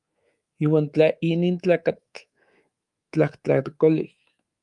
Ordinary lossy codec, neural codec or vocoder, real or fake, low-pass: Opus, 32 kbps; codec, 24 kHz, 3.1 kbps, DualCodec; fake; 10.8 kHz